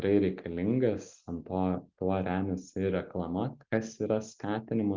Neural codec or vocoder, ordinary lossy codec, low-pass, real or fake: none; Opus, 32 kbps; 7.2 kHz; real